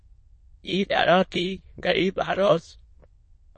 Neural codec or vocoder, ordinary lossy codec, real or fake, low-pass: autoencoder, 22.05 kHz, a latent of 192 numbers a frame, VITS, trained on many speakers; MP3, 32 kbps; fake; 9.9 kHz